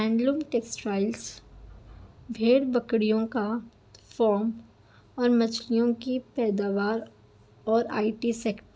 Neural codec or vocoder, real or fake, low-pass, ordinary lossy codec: none; real; none; none